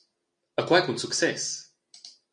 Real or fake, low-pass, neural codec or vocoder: real; 9.9 kHz; none